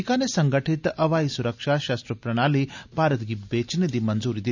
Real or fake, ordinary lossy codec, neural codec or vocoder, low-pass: real; none; none; 7.2 kHz